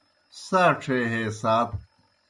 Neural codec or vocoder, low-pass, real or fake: none; 10.8 kHz; real